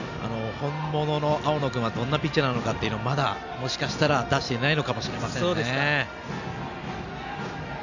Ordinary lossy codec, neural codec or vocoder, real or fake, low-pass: none; none; real; 7.2 kHz